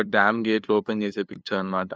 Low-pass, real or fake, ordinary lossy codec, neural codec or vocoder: none; fake; none; codec, 16 kHz, 2 kbps, FunCodec, trained on LibriTTS, 25 frames a second